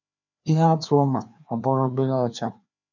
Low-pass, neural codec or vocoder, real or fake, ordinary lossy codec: 7.2 kHz; codec, 16 kHz, 2 kbps, FreqCodec, larger model; fake; none